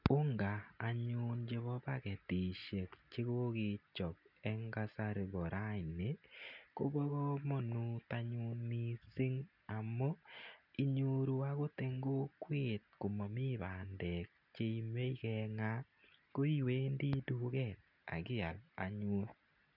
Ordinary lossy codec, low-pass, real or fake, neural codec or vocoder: none; 5.4 kHz; real; none